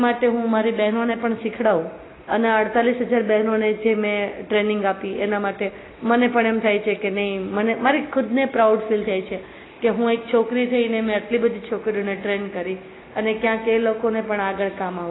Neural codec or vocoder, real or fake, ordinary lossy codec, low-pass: none; real; AAC, 16 kbps; 7.2 kHz